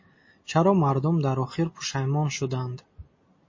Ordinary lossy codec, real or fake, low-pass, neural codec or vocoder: MP3, 32 kbps; real; 7.2 kHz; none